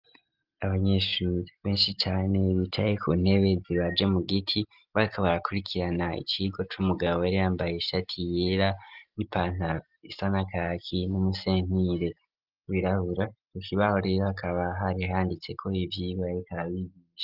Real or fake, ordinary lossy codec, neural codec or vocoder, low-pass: real; Opus, 24 kbps; none; 5.4 kHz